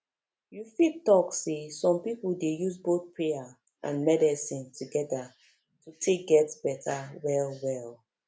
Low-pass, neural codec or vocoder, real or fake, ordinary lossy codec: none; none; real; none